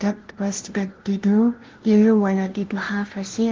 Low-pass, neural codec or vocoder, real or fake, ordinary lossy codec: 7.2 kHz; codec, 16 kHz, 0.5 kbps, FunCodec, trained on LibriTTS, 25 frames a second; fake; Opus, 16 kbps